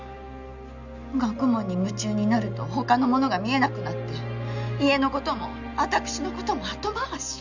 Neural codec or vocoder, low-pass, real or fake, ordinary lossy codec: none; 7.2 kHz; real; none